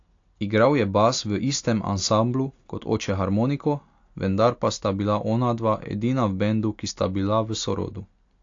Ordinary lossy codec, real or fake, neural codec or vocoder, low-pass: AAC, 48 kbps; real; none; 7.2 kHz